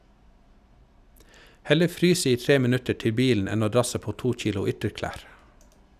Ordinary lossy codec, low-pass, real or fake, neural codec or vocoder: none; 14.4 kHz; real; none